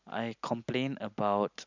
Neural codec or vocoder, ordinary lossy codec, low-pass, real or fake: none; none; 7.2 kHz; real